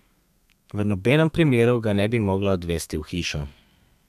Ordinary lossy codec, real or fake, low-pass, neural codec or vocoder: none; fake; 14.4 kHz; codec, 32 kHz, 1.9 kbps, SNAC